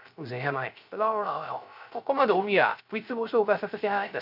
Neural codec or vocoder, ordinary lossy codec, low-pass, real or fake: codec, 16 kHz, 0.3 kbps, FocalCodec; none; 5.4 kHz; fake